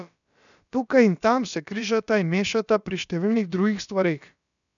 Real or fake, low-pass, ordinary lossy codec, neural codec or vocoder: fake; 7.2 kHz; none; codec, 16 kHz, about 1 kbps, DyCAST, with the encoder's durations